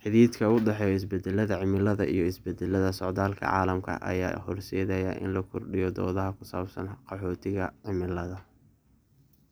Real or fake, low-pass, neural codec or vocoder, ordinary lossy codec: real; none; none; none